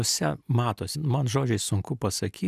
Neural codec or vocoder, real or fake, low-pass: none; real; 14.4 kHz